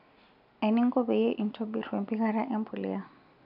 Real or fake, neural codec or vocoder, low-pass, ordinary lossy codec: real; none; 5.4 kHz; none